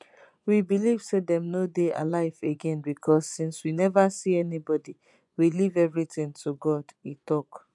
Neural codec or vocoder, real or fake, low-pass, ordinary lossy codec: vocoder, 24 kHz, 100 mel bands, Vocos; fake; 10.8 kHz; none